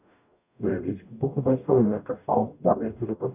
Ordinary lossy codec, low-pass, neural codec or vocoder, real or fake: none; 3.6 kHz; codec, 44.1 kHz, 0.9 kbps, DAC; fake